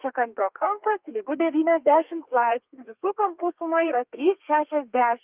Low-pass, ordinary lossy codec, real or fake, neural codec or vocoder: 3.6 kHz; Opus, 32 kbps; fake; codec, 32 kHz, 1.9 kbps, SNAC